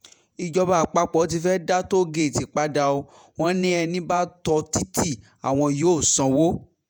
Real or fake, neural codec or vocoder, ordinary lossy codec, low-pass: fake; vocoder, 48 kHz, 128 mel bands, Vocos; none; none